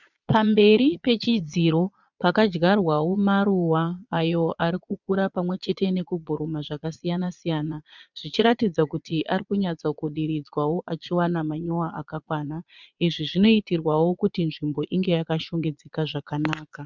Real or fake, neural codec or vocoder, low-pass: fake; vocoder, 22.05 kHz, 80 mel bands, Vocos; 7.2 kHz